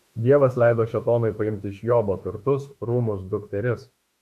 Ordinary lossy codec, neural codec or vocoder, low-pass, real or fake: MP3, 64 kbps; autoencoder, 48 kHz, 32 numbers a frame, DAC-VAE, trained on Japanese speech; 14.4 kHz; fake